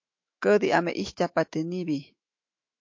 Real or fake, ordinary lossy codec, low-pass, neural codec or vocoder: fake; MP3, 48 kbps; 7.2 kHz; autoencoder, 48 kHz, 128 numbers a frame, DAC-VAE, trained on Japanese speech